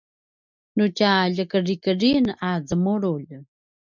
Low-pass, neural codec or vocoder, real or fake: 7.2 kHz; none; real